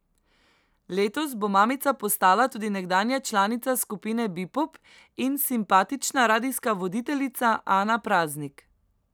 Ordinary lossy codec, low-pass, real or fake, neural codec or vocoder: none; none; real; none